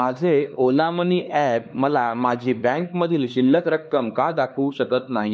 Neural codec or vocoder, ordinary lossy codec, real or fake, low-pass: codec, 16 kHz, 2 kbps, X-Codec, HuBERT features, trained on LibriSpeech; none; fake; none